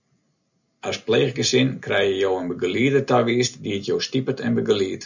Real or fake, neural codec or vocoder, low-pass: real; none; 7.2 kHz